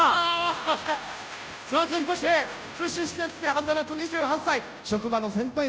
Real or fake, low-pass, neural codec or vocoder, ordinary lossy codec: fake; none; codec, 16 kHz, 0.5 kbps, FunCodec, trained on Chinese and English, 25 frames a second; none